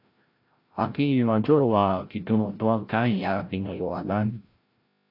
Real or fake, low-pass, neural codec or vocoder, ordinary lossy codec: fake; 5.4 kHz; codec, 16 kHz, 0.5 kbps, FreqCodec, larger model; AAC, 32 kbps